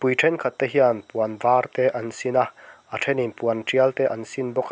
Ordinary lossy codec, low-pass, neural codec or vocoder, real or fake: none; none; none; real